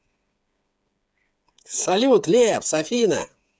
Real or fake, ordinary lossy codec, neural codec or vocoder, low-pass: fake; none; codec, 16 kHz, 8 kbps, FreqCodec, smaller model; none